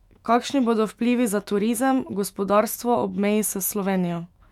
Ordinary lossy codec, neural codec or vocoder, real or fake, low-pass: MP3, 96 kbps; autoencoder, 48 kHz, 128 numbers a frame, DAC-VAE, trained on Japanese speech; fake; 19.8 kHz